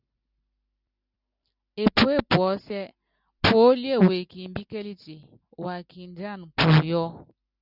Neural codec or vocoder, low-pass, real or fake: none; 5.4 kHz; real